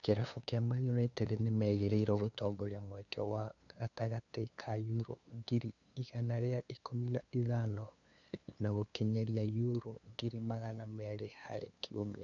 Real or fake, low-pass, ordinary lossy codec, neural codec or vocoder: fake; 7.2 kHz; none; codec, 16 kHz, 2 kbps, FunCodec, trained on LibriTTS, 25 frames a second